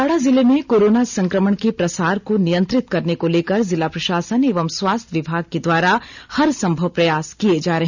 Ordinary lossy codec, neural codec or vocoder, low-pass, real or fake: none; none; 7.2 kHz; real